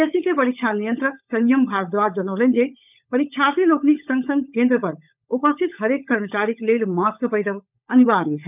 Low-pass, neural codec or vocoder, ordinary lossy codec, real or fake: 3.6 kHz; codec, 16 kHz, 16 kbps, FunCodec, trained on LibriTTS, 50 frames a second; none; fake